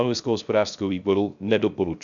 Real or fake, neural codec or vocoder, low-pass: fake; codec, 16 kHz, 0.3 kbps, FocalCodec; 7.2 kHz